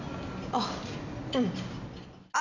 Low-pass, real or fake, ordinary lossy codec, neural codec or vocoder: 7.2 kHz; real; none; none